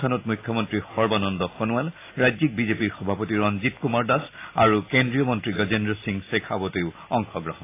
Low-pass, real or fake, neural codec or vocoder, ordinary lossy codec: 3.6 kHz; real; none; AAC, 24 kbps